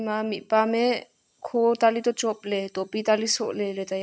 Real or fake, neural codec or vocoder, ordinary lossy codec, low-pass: real; none; none; none